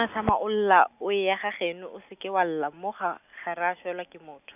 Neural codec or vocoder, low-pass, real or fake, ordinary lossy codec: none; 3.6 kHz; real; none